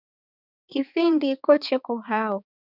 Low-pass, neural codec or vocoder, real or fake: 5.4 kHz; codec, 16 kHz, 4 kbps, X-Codec, HuBERT features, trained on balanced general audio; fake